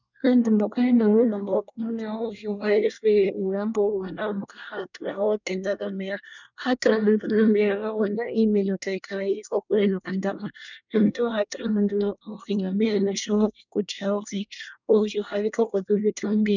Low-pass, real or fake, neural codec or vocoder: 7.2 kHz; fake; codec, 24 kHz, 1 kbps, SNAC